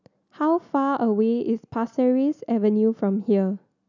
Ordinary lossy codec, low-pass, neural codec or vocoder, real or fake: none; 7.2 kHz; none; real